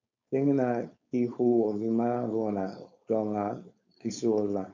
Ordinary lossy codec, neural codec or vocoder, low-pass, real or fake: MP3, 64 kbps; codec, 16 kHz, 4.8 kbps, FACodec; 7.2 kHz; fake